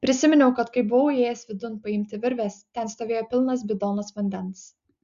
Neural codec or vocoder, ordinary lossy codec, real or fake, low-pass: none; Opus, 64 kbps; real; 7.2 kHz